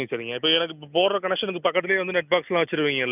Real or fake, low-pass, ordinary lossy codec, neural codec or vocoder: real; 3.6 kHz; none; none